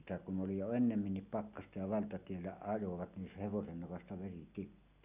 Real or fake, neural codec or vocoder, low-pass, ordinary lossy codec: real; none; 3.6 kHz; none